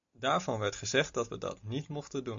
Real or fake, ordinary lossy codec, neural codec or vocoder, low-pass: real; AAC, 64 kbps; none; 7.2 kHz